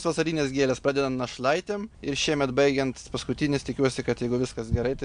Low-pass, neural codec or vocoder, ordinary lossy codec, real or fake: 9.9 kHz; none; MP3, 64 kbps; real